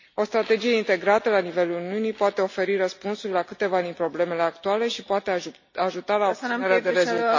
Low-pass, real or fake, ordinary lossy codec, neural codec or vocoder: 7.2 kHz; real; MP3, 32 kbps; none